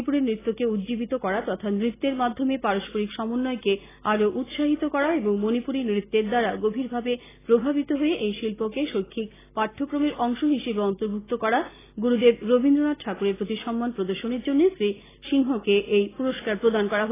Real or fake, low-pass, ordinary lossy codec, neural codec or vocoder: real; 3.6 kHz; AAC, 16 kbps; none